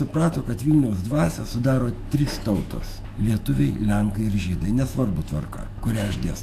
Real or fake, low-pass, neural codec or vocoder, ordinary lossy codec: fake; 14.4 kHz; autoencoder, 48 kHz, 128 numbers a frame, DAC-VAE, trained on Japanese speech; AAC, 64 kbps